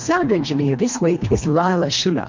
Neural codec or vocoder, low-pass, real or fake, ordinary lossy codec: codec, 24 kHz, 1.5 kbps, HILCodec; 7.2 kHz; fake; MP3, 48 kbps